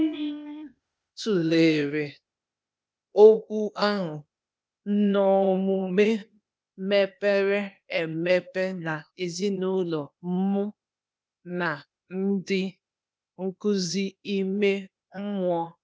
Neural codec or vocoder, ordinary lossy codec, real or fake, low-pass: codec, 16 kHz, 0.8 kbps, ZipCodec; none; fake; none